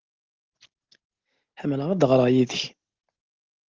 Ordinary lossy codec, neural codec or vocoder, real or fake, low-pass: Opus, 16 kbps; none; real; 7.2 kHz